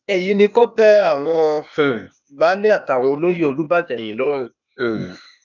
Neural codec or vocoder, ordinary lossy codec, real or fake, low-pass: codec, 16 kHz, 0.8 kbps, ZipCodec; none; fake; 7.2 kHz